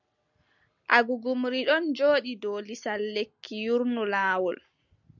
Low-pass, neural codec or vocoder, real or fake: 7.2 kHz; none; real